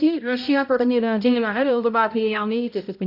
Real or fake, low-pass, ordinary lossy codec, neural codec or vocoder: fake; 5.4 kHz; none; codec, 16 kHz, 0.5 kbps, X-Codec, HuBERT features, trained on balanced general audio